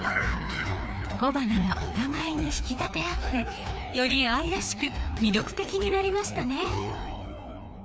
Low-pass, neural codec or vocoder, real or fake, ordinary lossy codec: none; codec, 16 kHz, 2 kbps, FreqCodec, larger model; fake; none